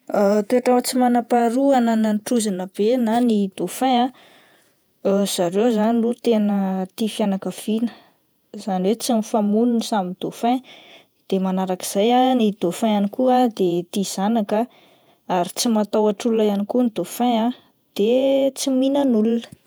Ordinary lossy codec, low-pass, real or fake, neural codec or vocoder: none; none; fake; vocoder, 48 kHz, 128 mel bands, Vocos